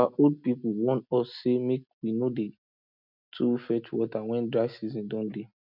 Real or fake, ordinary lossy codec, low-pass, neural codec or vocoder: real; none; 5.4 kHz; none